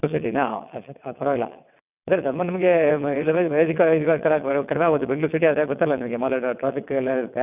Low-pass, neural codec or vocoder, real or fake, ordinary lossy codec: 3.6 kHz; vocoder, 22.05 kHz, 80 mel bands, WaveNeXt; fake; none